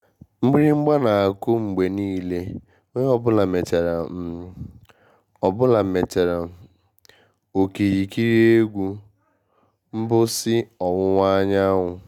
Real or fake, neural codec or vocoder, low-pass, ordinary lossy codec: real; none; 19.8 kHz; none